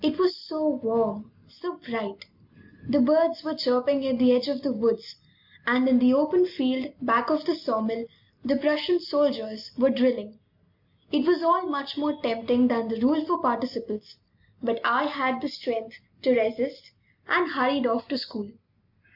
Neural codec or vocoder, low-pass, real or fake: none; 5.4 kHz; real